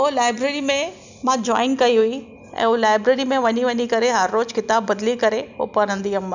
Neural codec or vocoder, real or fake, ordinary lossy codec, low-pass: none; real; none; 7.2 kHz